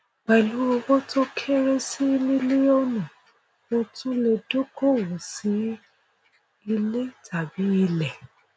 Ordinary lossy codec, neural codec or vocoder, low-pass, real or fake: none; none; none; real